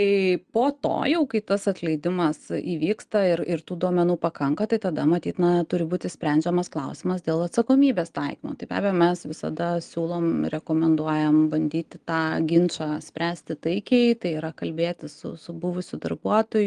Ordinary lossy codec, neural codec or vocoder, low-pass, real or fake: Opus, 24 kbps; none; 9.9 kHz; real